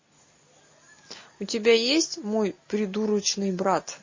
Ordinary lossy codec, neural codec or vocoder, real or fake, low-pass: MP3, 32 kbps; none; real; 7.2 kHz